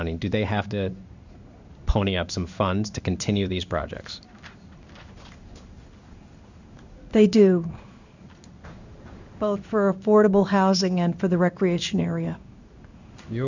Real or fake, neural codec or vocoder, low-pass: fake; codec, 16 kHz in and 24 kHz out, 1 kbps, XY-Tokenizer; 7.2 kHz